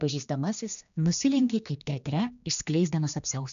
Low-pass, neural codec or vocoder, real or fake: 7.2 kHz; codec, 16 kHz, 2 kbps, X-Codec, HuBERT features, trained on general audio; fake